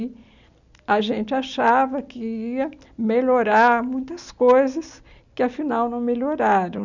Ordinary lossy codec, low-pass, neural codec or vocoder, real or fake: none; 7.2 kHz; none; real